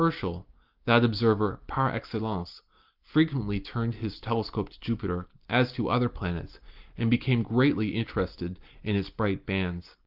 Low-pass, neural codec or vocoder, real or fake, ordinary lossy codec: 5.4 kHz; none; real; Opus, 32 kbps